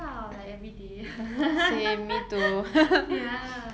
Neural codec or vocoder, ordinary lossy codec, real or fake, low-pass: none; none; real; none